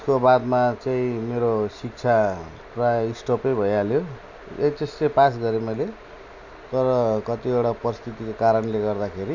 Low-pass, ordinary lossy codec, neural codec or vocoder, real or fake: 7.2 kHz; none; none; real